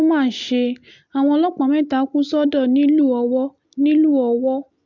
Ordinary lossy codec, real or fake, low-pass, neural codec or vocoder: MP3, 64 kbps; real; 7.2 kHz; none